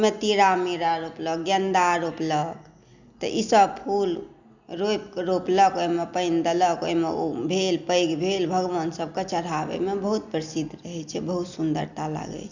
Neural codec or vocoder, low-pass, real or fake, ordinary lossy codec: none; 7.2 kHz; real; none